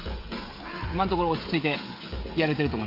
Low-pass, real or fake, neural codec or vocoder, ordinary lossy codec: 5.4 kHz; fake; codec, 16 kHz, 16 kbps, FreqCodec, smaller model; AAC, 32 kbps